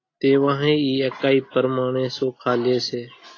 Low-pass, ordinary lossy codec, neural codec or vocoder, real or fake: 7.2 kHz; AAC, 32 kbps; none; real